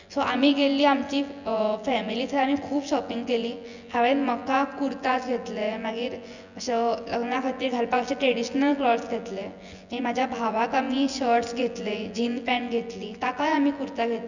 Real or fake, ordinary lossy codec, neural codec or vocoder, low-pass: fake; none; vocoder, 24 kHz, 100 mel bands, Vocos; 7.2 kHz